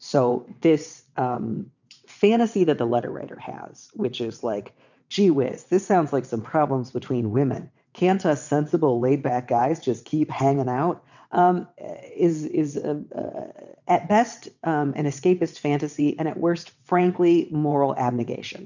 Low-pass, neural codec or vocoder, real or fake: 7.2 kHz; vocoder, 22.05 kHz, 80 mel bands, WaveNeXt; fake